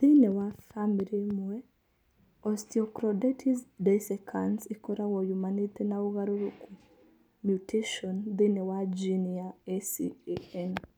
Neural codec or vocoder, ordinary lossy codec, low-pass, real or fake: none; none; none; real